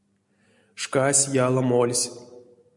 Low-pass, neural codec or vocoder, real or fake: 10.8 kHz; none; real